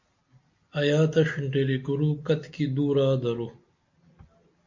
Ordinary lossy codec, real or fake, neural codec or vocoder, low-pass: MP3, 48 kbps; real; none; 7.2 kHz